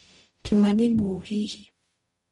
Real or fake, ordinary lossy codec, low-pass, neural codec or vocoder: fake; MP3, 48 kbps; 19.8 kHz; codec, 44.1 kHz, 0.9 kbps, DAC